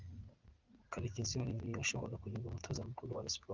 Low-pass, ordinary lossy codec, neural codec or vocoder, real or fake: 7.2 kHz; Opus, 32 kbps; vocoder, 44.1 kHz, 80 mel bands, Vocos; fake